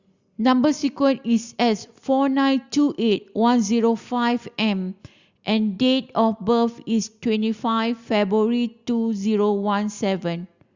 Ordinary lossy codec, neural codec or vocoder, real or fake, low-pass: Opus, 64 kbps; none; real; 7.2 kHz